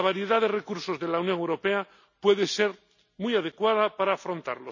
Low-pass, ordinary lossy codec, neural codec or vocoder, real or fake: 7.2 kHz; none; none; real